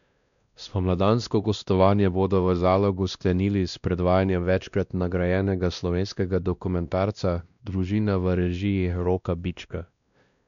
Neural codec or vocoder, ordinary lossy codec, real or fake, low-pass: codec, 16 kHz, 1 kbps, X-Codec, WavLM features, trained on Multilingual LibriSpeech; none; fake; 7.2 kHz